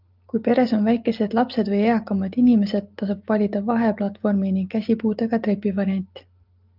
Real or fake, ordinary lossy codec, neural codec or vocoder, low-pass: real; Opus, 32 kbps; none; 5.4 kHz